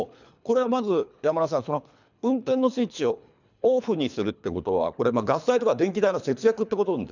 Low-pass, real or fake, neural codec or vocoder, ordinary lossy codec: 7.2 kHz; fake; codec, 24 kHz, 3 kbps, HILCodec; none